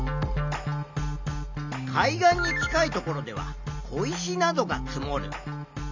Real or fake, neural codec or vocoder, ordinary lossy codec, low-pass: real; none; MP3, 64 kbps; 7.2 kHz